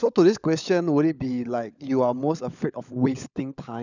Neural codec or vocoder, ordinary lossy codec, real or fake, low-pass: codec, 16 kHz, 16 kbps, FunCodec, trained on LibriTTS, 50 frames a second; none; fake; 7.2 kHz